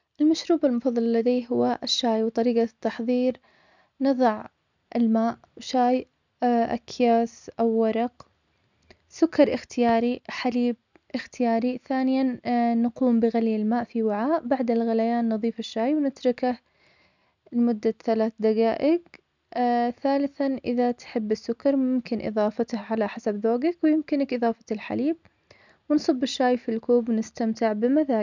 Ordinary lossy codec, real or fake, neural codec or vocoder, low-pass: none; real; none; 7.2 kHz